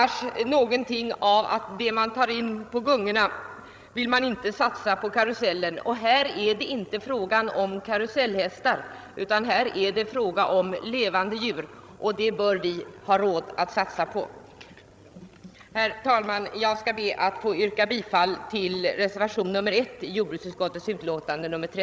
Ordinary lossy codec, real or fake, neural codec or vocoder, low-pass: none; fake; codec, 16 kHz, 16 kbps, FreqCodec, larger model; none